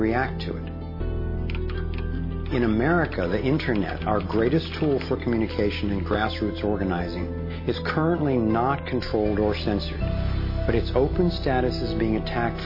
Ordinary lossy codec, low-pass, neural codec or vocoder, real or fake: MP3, 24 kbps; 5.4 kHz; none; real